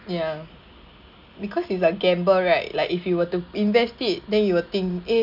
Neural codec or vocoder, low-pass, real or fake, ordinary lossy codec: none; 5.4 kHz; real; none